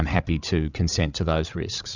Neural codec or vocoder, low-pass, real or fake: codec, 16 kHz, 16 kbps, FreqCodec, larger model; 7.2 kHz; fake